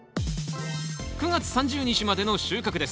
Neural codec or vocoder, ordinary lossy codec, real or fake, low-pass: none; none; real; none